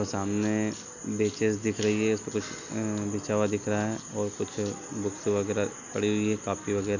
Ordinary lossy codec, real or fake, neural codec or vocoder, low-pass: none; real; none; 7.2 kHz